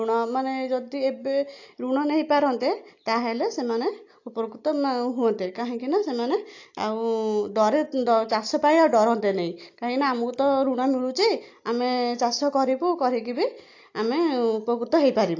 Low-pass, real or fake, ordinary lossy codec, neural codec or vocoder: 7.2 kHz; real; AAC, 48 kbps; none